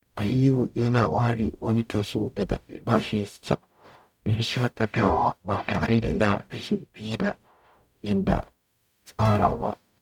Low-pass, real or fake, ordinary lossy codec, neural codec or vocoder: 19.8 kHz; fake; none; codec, 44.1 kHz, 0.9 kbps, DAC